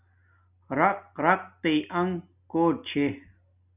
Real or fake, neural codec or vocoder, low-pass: real; none; 3.6 kHz